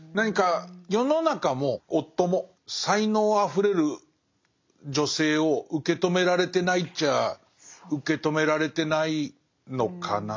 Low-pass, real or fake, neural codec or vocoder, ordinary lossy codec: 7.2 kHz; real; none; MP3, 48 kbps